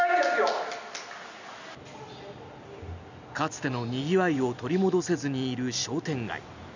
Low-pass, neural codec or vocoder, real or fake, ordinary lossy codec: 7.2 kHz; none; real; none